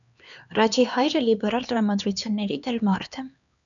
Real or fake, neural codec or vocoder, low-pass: fake; codec, 16 kHz, 2 kbps, X-Codec, HuBERT features, trained on LibriSpeech; 7.2 kHz